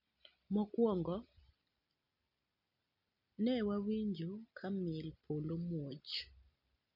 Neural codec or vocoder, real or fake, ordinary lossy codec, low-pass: none; real; none; 5.4 kHz